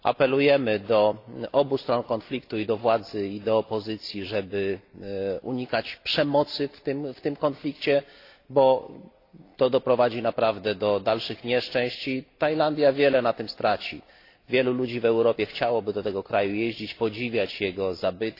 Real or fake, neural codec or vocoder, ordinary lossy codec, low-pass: real; none; AAC, 32 kbps; 5.4 kHz